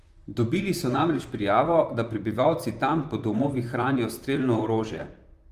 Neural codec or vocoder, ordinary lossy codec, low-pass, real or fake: vocoder, 44.1 kHz, 128 mel bands, Pupu-Vocoder; Opus, 32 kbps; 14.4 kHz; fake